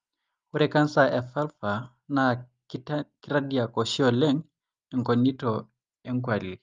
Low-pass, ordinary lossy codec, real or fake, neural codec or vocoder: 7.2 kHz; Opus, 32 kbps; real; none